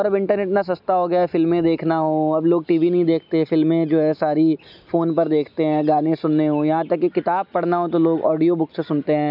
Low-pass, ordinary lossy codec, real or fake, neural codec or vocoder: 5.4 kHz; none; real; none